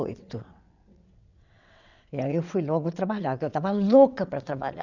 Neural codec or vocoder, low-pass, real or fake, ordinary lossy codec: vocoder, 22.05 kHz, 80 mel bands, WaveNeXt; 7.2 kHz; fake; none